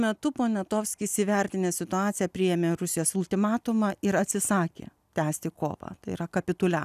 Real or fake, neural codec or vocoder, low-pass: real; none; 14.4 kHz